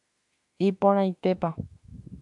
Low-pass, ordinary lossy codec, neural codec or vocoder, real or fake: 10.8 kHz; AAC, 64 kbps; autoencoder, 48 kHz, 32 numbers a frame, DAC-VAE, trained on Japanese speech; fake